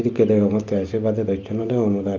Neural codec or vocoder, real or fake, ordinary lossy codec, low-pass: none; real; Opus, 32 kbps; 7.2 kHz